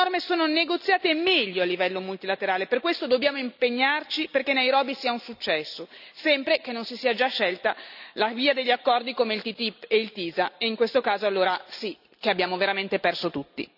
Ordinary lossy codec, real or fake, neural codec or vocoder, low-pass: none; real; none; 5.4 kHz